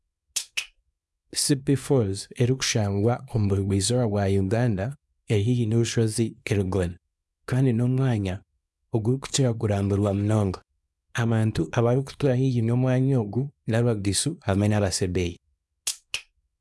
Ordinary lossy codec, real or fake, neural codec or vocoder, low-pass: none; fake; codec, 24 kHz, 0.9 kbps, WavTokenizer, small release; none